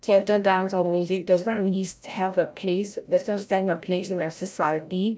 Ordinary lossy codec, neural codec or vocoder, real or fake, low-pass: none; codec, 16 kHz, 0.5 kbps, FreqCodec, larger model; fake; none